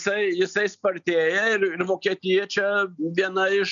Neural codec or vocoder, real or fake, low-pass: none; real; 7.2 kHz